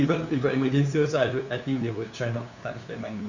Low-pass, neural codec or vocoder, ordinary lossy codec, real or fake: 7.2 kHz; codec, 16 kHz, 2 kbps, FunCodec, trained on LibriTTS, 25 frames a second; Opus, 64 kbps; fake